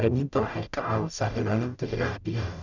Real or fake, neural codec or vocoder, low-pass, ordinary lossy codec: fake; codec, 44.1 kHz, 0.9 kbps, DAC; 7.2 kHz; none